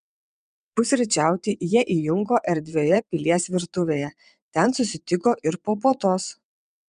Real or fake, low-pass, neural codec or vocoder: fake; 9.9 kHz; vocoder, 22.05 kHz, 80 mel bands, WaveNeXt